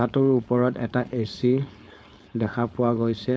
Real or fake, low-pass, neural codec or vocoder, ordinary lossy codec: fake; none; codec, 16 kHz, 4.8 kbps, FACodec; none